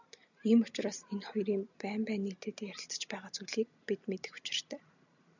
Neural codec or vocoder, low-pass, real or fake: none; 7.2 kHz; real